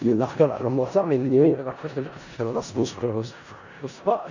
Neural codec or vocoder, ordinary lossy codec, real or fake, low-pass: codec, 16 kHz in and 24 kHz out, 0.4 kbps, LongCat-Audio-Codec, four codebook decoder; none; fake; 7.2 kHz